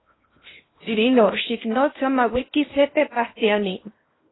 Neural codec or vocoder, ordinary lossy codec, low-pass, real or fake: codec, 16 kHz in and 24 kHz out, 0.8 kbps, FocalCodec, streaming, 65536 codes; AAC, 16 kbps; 7.2 kHz; fake